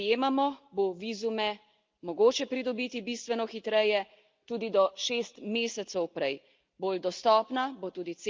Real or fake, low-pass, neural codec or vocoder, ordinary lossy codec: real; 7.2 kHz; none; Opus, 24 kbps